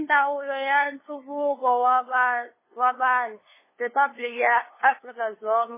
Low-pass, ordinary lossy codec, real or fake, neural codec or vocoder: 3.6 kHz; MP3, 16 kbps; fake; codec, 16 kHz, 2 kbps, FunCodec, trained on LibriTTS, 25 frames a second